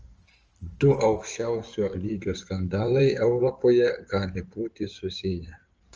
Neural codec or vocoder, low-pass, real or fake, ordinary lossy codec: codec, 16 kHz in and 24 kHz out, 2.2 kbps, FireRedTTS-2 codec; 7.2 kHz; fake; Opus, 24 kbps